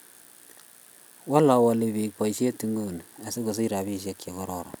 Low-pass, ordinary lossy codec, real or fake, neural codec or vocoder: none; none; real; none